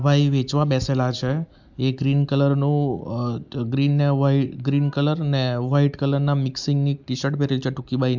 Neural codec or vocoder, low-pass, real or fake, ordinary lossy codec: none; 7.2 kHz; real; MP3, 64 kbps